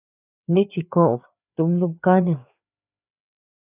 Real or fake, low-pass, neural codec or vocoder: fake; 3.6 kHz; codec, 16 kHz, 4 kbps, FreqCodec, larger model